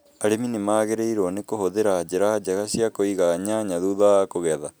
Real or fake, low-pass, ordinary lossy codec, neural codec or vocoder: real; none; none; none